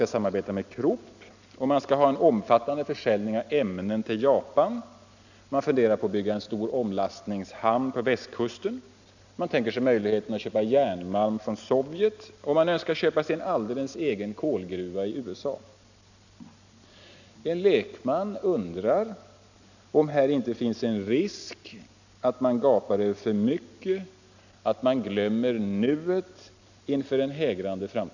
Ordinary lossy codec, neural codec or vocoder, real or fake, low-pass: none; none; real; 7.2 kHz